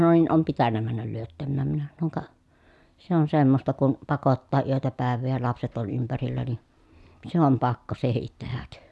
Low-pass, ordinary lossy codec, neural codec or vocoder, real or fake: none; none; none; real